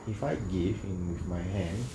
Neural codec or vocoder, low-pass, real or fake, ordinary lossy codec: none; none; real; none